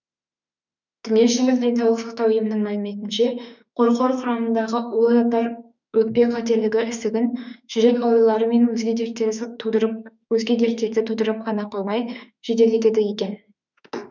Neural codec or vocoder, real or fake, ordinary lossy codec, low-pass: autoencoder, 48 kHz, 32 numbers a frame, DAC-VAE, trained on Japanese speech; fake; none; 7.2 kHz